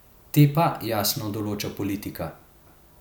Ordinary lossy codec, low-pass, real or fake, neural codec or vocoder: none; none; real; none